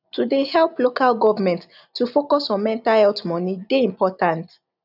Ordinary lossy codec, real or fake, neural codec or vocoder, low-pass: none; real; none; 5.4 kHz